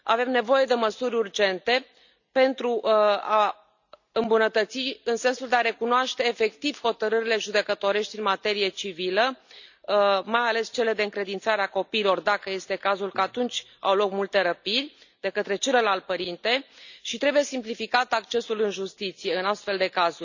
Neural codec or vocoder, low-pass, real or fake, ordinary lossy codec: none; 7.2 kHz; real; none